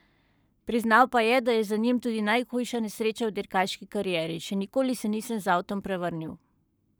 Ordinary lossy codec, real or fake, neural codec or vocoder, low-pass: none; fake; codec, 44.1 kHz, 7.8 kbps, Pupu-Codec; none